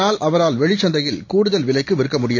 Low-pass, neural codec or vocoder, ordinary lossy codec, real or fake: 7.2 kHz; none; none; real